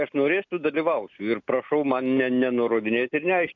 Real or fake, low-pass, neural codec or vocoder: real; 7.2 kHz; none